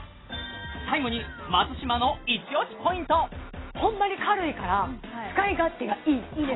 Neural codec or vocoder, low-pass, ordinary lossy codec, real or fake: none; 7.2 kHz; AAC, 16 kbps; real